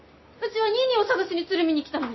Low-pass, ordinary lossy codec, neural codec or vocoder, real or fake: 7.2 kHz; MP3, 24 kbps; none; real